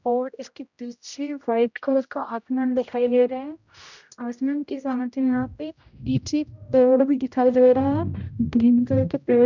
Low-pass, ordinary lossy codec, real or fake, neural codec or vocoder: 7.2 kHz; none; fake; codec, 16 kHz, 0.5 kbps, X-Codec, HuBERT features, trained on general audio